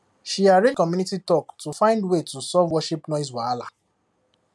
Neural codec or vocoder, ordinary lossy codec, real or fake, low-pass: none; none; real; none